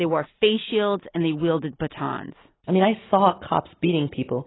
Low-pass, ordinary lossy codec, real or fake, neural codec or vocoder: 7.2 kHz; AAC, 16 kbps; real; none